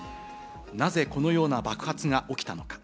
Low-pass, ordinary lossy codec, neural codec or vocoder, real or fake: none; none; none; real